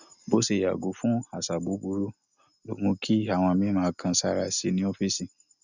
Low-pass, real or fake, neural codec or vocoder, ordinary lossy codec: 7.2 kHz; real; none; none